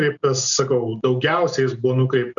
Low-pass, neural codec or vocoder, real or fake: 7.2 kHz; none; real